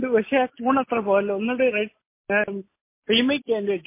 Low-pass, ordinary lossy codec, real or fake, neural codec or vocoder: 3.6 kHz; MP3, 24 kbps; real; none